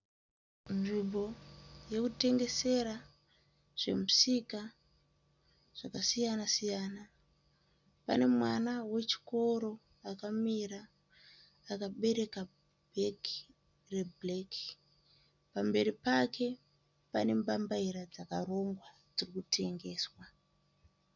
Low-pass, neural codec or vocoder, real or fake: 7.2 kHz; none; real